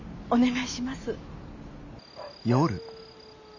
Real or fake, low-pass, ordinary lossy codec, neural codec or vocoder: real; 7.2 kHz; none; none